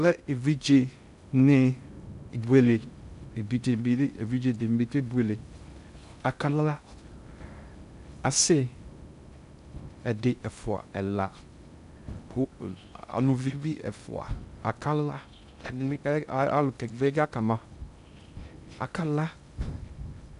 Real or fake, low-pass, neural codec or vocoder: fake; 10.8 kHz; codec, 16 kHz in and 24 kHz out, 0.6 kbps, FocalCodec, streaming, 2048 codes